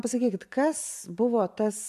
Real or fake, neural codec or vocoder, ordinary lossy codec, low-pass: fake; vocoder, 44.1 kHz, 128 mel bands every 512 samples, BigVGAN v2; AAC, 96 kbps; 14.4 kHz